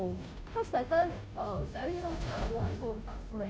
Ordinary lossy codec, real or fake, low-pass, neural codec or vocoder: none; fake; none; codec, 16 kHz, 0.5 kbps, FunCodec, trained on Chinese and English, 25 frames a second